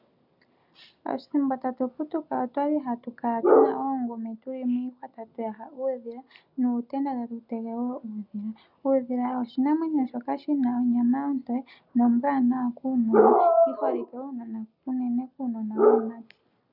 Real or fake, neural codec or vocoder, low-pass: real; none; 5.4 kHz